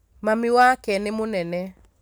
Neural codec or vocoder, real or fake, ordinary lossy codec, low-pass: none; real; none; none